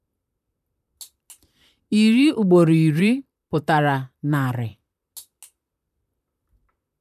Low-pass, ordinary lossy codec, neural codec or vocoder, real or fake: 14.4 kHz; none; vocoder, 44.1 kHz, 128 mel bands, Pupu-Vocoder; fake